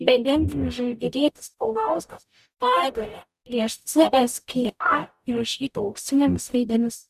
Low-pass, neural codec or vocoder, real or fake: 14.4 kHz; codec, 44.1 kHz, 0.9 kbps, DAC; fake